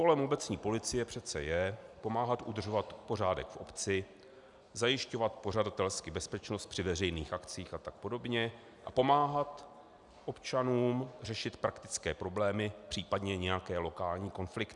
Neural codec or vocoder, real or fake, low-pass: none; real; 10.8 kHz